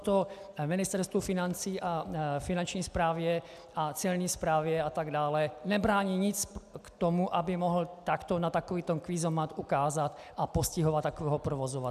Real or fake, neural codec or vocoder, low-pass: real; none; 14.4 kHz